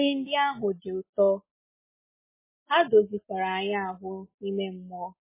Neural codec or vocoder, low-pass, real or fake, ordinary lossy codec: none; 3.6 kHz; real; MP3, 16 kbps